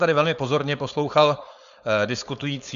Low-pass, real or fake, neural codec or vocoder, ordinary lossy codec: 7.2 kHz; fake; codec, 16 kHz, 4.8 kbps, FACodec; Opus, 64 kbps